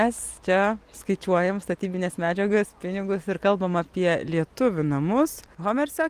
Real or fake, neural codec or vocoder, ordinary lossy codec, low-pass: real; none; Opus, 32 kbps; 14.4 kHz